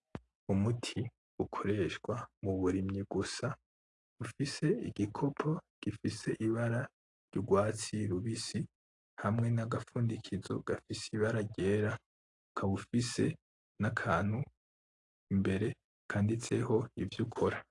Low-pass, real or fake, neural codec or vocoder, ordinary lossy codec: 10.8 kHz; real; none; AAC, 48 kbps